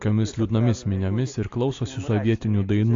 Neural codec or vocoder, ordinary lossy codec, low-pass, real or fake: none; AAC, 48 kbps; 7.2 kHz; real